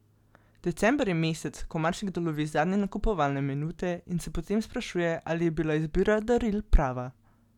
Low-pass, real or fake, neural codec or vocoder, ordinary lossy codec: 19.8 kHz; real; none; none